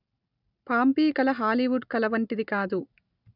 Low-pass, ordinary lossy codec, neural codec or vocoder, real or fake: 5.4 kHz; none; none; real